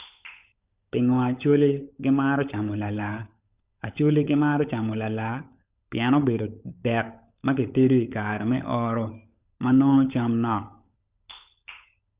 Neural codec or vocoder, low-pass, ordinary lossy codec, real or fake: codec, 16 kHz, 16 kbps, FunCodec, trained on LibriTTS, 50 frames a second; 3.6 kHz; Opus, 64 kbps; fake